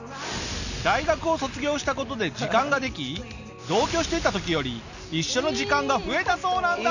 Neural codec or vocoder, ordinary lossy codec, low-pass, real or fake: none; none; 7.2 kHz; real